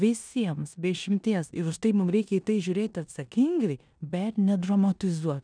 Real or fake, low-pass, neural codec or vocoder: fake; 9.9 kHz; codec, 16 kHz in and 24 kHz out, 0.9 kbps, LongCat-Audio-Codec, four codebook decoder